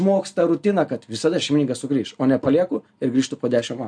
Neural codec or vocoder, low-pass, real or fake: none; 9.9 kHz; real